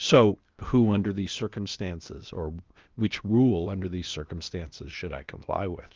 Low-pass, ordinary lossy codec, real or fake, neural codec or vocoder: 7.2 kHz; Opus, 24 kbps; fake; codec, 16 kHz, 0.8 kbps, ZipCodec